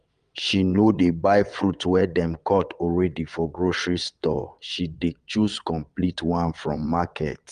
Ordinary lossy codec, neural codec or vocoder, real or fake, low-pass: Opus, 32 kbps; vocoder, 22.05 kHz, 80 mel bands, WaveNeXt; fake; 9.9 kHz